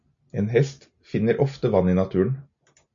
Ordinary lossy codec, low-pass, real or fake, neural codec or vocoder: AAC, 64 kbps; 7.2 kHz; real; none